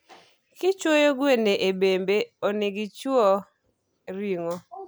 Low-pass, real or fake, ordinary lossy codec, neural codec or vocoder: none; real; none; none